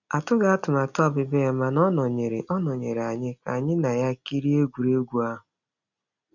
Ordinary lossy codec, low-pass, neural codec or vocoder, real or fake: none; 7.2 kHz; none; real